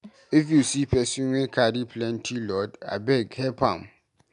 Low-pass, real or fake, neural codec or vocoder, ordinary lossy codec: 10.8 kHz; real; none; none